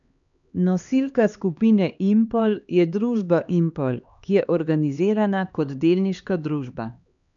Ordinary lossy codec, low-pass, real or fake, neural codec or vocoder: none; 7.2 kHz; fake; codec, 16 kHz, 2 kbps, X-Codec, HuBERT features, trained on LibriSpeech